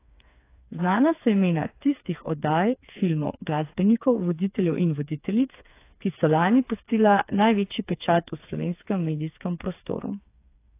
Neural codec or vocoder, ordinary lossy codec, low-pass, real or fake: codec, 16 kHz, 4 kbps, FreqCodec, smaller model; AAC, 24 kbps; 3.6 kHz; fake